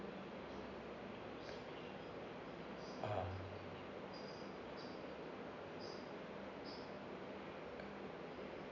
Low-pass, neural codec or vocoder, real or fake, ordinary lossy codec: 7.2 kHz; none; real; none